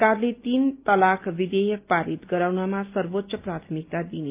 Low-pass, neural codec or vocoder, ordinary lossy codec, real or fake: 3.6 kHz; none; Opus, 24 kbps; real